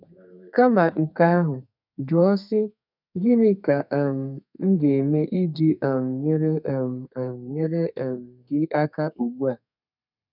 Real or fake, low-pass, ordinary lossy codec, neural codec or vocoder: fake; 5.4 kHz; none; codec, 32 kHz, 1.9 kbps, SNAC